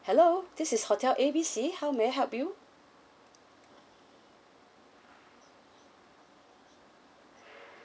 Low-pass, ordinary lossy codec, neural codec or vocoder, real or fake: none; none; none; real